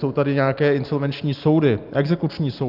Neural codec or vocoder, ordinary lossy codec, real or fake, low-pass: none; Opus, 24 kbps; real; 5.4 kHz